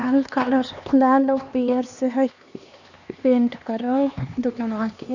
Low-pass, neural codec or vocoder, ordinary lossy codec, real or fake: 7.2 kHz; codec, 16 kHz, 2 kbps, X-Codec, HuBERT features, trained on LibriSpeech; none; fake